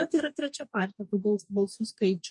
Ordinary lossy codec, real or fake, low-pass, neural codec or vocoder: MP3, 48 kbps; fake; 10.8 kHz; codec, 44.1 kHz, 2.6 kbps, DAC